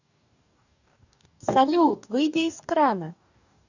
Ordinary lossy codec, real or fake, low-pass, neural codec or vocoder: none; fake; 7.2 kHz; codec, 44.1 kHz, 2.6 kbps, DAC